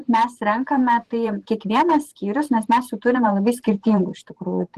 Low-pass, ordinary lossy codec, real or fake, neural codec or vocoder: 14.4 kHz; Opus, 16 kbps; fake; vocoder, 44.1 kHz, 128 mel bands every 512 samples, BigVGAN v2